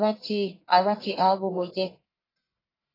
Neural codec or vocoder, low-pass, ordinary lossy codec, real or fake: codec, 44.1 kHz, 1.7 kbps, Pupu-Codec; 5.4 kHz; AAC, 24 kbps; fake